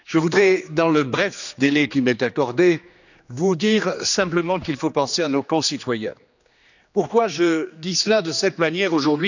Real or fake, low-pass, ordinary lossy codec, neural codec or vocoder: fake; 7.2 kHz; none; codec, 16 kHz, 2 kbps, X-Codec, HuBERT features, trained on general audio